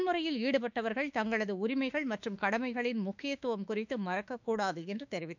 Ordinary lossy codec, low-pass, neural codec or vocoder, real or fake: none; 7.2 kHz; autoencoder, 48 kHz, 32 numbers a frame, DAC-VAE, trained on Japanese speech; fake